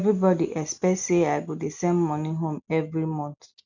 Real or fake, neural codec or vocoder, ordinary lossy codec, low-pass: real; none; none; 7.2 kHz